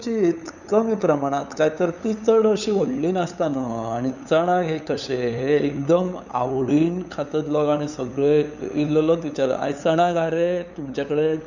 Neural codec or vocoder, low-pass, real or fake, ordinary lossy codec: codec, 16 kHz, 8 kbps, FunCodec, trained on LibriTTS, 25 frames a second; 7.2 kHz; fake; none